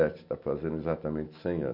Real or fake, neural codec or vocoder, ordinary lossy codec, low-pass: real; none; none; 5.4 kHz